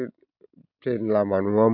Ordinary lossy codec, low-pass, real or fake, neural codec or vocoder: none; 5.4 kHz; real; none